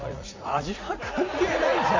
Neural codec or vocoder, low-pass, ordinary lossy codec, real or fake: vocoder, 44.1 kHz, 80 mel bands, Vocos; 7.2 kHz; MP3, 64 kbps; fake